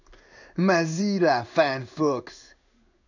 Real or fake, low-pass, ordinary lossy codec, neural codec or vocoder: fake; 7.2 kHz; none; codec, 16 kHz in and 24 kHz out, 1 kbps, XY-Tokenizer